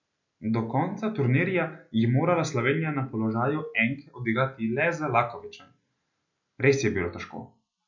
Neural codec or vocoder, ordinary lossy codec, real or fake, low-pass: none; none; real; 7.2 kHz